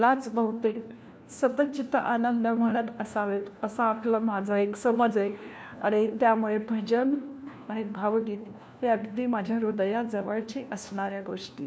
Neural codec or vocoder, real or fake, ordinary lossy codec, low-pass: codec, 16 kHz, 1 kbps, FunCodec, trained on LibriTTS, 50 frames a second; fake; none; none